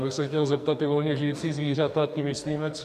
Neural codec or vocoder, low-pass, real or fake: codec, 44.1 kHz, 2.6 kbps, SNAC; 14.4 kHz; fake